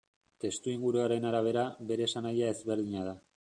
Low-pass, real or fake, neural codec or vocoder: 9.9 kHz; real; none